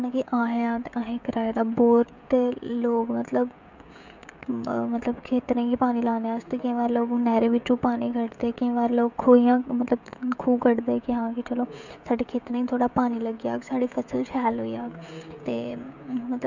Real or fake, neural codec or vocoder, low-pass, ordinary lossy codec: fake; codec, 16 kHz, 16 kbps, FreqCodec, smaller model; 7.2 kHz; none